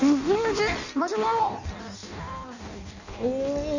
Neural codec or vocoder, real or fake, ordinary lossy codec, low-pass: codec, 16 kHz in and 24 kHz out, 0.6 kbps, FireRedTTS-2 codec; fake; none; 7.2 kHz